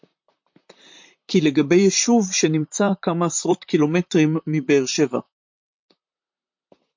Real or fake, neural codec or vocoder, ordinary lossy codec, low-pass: fake; vocoder, 44.1 kHz, 128 mel bands, Pupu-Vocoder; MP3, 48 kbps; 7.2 kHz